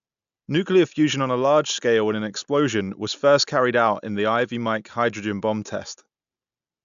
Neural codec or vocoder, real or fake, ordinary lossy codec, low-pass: none; real; AAC, 96 kbps; 7.2 kHz